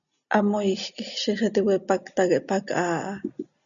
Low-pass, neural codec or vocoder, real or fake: 7.2 kHz; none; real